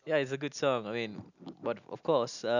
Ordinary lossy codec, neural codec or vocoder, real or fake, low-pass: none; none; real; 7.2 kHz